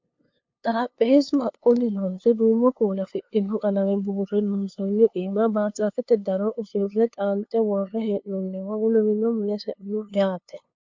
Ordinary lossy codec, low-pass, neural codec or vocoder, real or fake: MP3, 48 kbps; 7.2 kHz; codec, 16 kHz, 2 kbps, FunCodec, trained on LibriTTS, 25 frames a second; fake